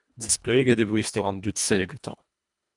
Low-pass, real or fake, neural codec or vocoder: 10.8 kHz; fake; codec, 24 kHz, 1.5 kbps, HILCodec